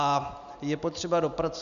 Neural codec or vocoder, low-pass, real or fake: none; 7.2 kHz; real